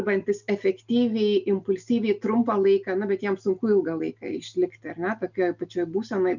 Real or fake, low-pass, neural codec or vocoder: real; 7.2 kHz; none